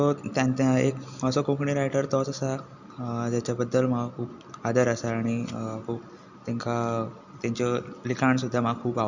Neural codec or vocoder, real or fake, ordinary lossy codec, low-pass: none; real; none; 7.2 kHz